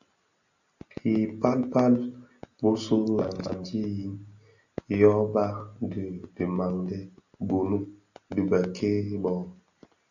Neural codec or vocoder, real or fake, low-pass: none; real; 7.2 kHz